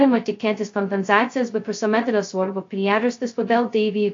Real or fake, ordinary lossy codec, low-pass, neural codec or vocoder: fake; AAC, 48 kbps; 7.2 kHz; codec, 16 kHz, 0.2 kbps, FocalCodec